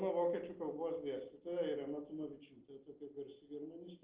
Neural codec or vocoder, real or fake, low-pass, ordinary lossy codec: none; real; 3.6 kHz; Opus, 16 kbps